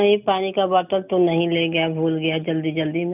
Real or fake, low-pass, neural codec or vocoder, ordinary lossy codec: real; 3.6 kHz; none; none